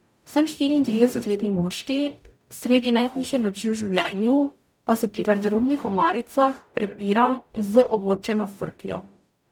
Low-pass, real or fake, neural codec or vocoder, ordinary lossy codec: 19.8 kHz; fake; codec, 44.1 kHz, 0.9 kbps, DAC; none